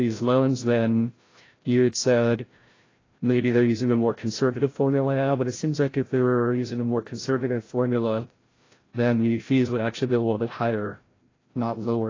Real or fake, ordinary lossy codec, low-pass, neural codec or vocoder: fake; AAC, 32 kbps; 7.2 kHz; codec, 16 kHz, 0.5 kbps, FreqCodec, larger model